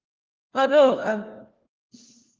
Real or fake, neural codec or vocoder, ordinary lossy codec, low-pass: fake; codec, 16 kHz, 1 kbps, FunCodec, trained on LibriTTS, 50 frames a second; Opus, 16 kbps; 7.2 kHz